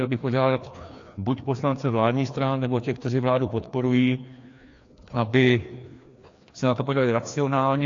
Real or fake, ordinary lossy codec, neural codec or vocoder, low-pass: fake; AAC, 48 kbps; codec, 16 kHz, 2 kbps, FreqCodec, larger model; 7.2 kHz